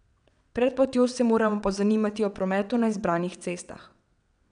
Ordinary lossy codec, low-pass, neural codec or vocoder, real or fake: none; 9.9 kHz; vocoder, 22.05 kHz, 80 mel bands, WaveNeXt; fake